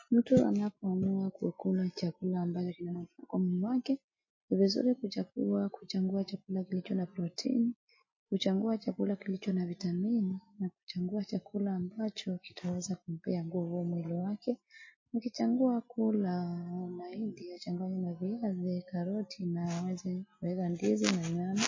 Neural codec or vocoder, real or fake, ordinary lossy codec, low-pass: none; real; MP3, 32 kbps; 7.2 kHz